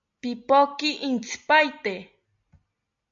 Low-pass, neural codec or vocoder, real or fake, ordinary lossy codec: 7.2 kHz; none; real; AAC, 64 kbps